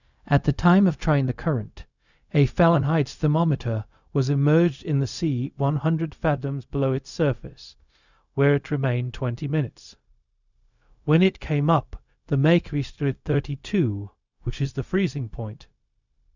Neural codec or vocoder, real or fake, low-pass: codec, 16 kHz, 0.4 kbps, LongCat-Audio-Codec; fake; 7.2 kHz